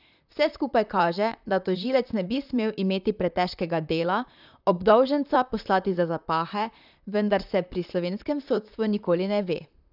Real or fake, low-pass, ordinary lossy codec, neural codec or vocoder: fake; 5.4 kHz; none; vocoder, 44.1 kHz, 128 mel bands, Pupu-Vocoder